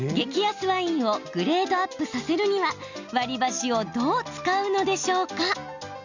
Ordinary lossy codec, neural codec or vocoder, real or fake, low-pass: none; none; real; 7.2 kHz